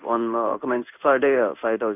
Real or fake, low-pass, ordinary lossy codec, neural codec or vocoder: fake; 3.6 kHz; none; codec, 16 kHz in and 24 kHz out, 1 kbps, XY-Tokenizer